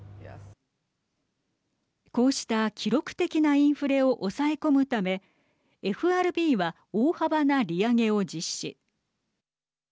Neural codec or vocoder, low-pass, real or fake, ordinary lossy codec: none; none; real; none